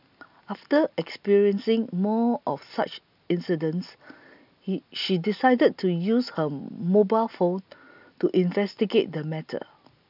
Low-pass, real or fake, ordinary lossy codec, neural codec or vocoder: 5.4 kHz; real; none; none